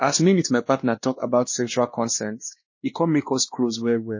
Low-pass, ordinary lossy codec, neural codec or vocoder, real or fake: 7.2 kHz; MP3, 32 kbps; codec, 16 kHz, 1 kbps, X-Codec, WavLM features, trained on Multilingual LibriSpeech; fake